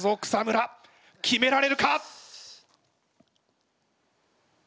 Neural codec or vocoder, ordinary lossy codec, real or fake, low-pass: none; none; real; none